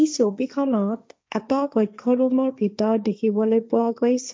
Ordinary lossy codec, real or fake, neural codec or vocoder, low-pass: none; fake; codec, 16 kHz, 1.1 kbps, Voila-Tokenizer; none